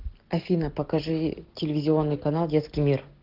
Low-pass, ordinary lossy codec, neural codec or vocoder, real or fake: 5.4 kHz; Opus, 16 kbps; none; real